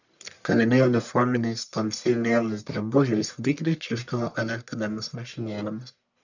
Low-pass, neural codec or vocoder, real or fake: 7.2 kHz; codec, 44.1 kHz, 1.7 kbps, Pupu-Codec; fake